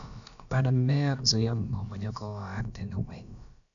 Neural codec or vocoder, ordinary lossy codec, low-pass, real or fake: codec, 16 kHz, about 1 kbps, DyCAST, with the encoder's durations; none; 7.2 kHz; fake